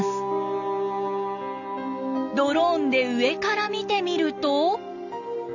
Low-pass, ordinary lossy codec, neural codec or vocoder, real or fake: 7.2 kHz; none; none; real